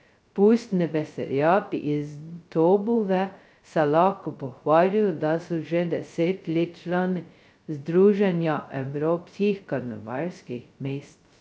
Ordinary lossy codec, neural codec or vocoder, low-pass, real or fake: none; codec, 16 kHz, 0.2 kbps, FocalCodec; none; fake